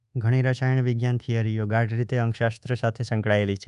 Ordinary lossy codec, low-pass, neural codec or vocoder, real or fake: none; 10.8 kHz; codec, 24 kHz, 1.2 kbps, DualCodec; fake